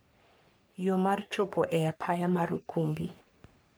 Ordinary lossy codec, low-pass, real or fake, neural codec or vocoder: none; none; fake; codec, 44.1 kHz, 3.4 kbps, Pupu-Codec